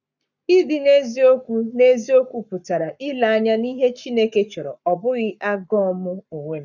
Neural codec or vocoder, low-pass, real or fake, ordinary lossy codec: codec, 44.1 kHz, 7.8 kbps, Pupu-Codec; 7.2 kHz; fake; none